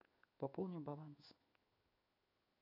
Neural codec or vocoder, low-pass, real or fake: codec, 16 kHz in and 24 kHz out, 1 kbps, XY-Tokenizer; 5.4 kHz; fake